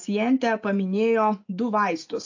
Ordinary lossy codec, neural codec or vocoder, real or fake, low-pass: AAC, 48 kbps; none; real; 7.2 kHz